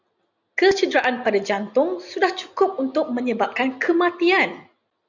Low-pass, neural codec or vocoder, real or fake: 7.2 kHz; none; real